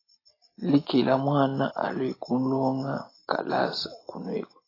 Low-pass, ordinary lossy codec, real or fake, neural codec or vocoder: 5.4 kHz; AAC, 24 kbps; real; none